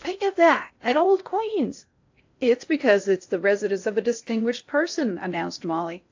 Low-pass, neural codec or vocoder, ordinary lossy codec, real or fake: 7.2 kHz; codec, 16 kHz in and 24 kHz out, 0.6 kbps, FocalCodec, streaming, 4096 codes; AAC, 48 kbps; fake